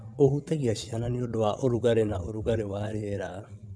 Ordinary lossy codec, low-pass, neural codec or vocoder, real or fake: none; none; vocoder, 22.05 kHz, 80 mel bands, WaveNeXt; fake